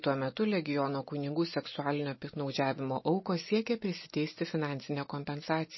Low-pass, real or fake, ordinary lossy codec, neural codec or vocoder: 7.2 kHz; real; MP3, 24 kbps; none